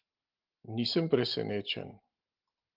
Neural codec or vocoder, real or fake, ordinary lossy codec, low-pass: none; real; Opus, 32 kbps; 5.4 kHz